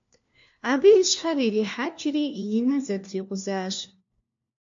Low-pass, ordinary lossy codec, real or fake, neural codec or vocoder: 7.2 kHz; MP3, 48 kbps; fake; codec, 16 kHz, 1 kbps, FunCodec, trained on LibriTTS, 50 frames a second